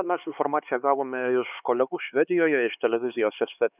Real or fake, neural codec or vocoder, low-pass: fake; codec, 16 kHz, 4 kbps, X-Codec, HuBERT features, trained on LibriSpeech; 3.6 kHz